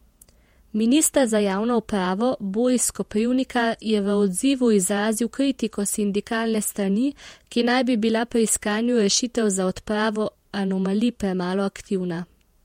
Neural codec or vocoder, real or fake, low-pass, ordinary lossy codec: vocoder, 48 kHz, 128 mel bands, Vocos; fake; 19.8 kHz; MP3, 64 kbps